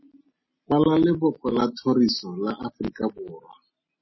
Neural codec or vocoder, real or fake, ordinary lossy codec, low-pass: none; real; MP3, 24 kbps; 7.2 kHz